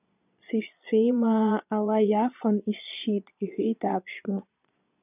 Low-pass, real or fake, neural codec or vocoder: 3.6 kHz; fake; vocoder, 22.05 kHz, 80 mel bands, Vocos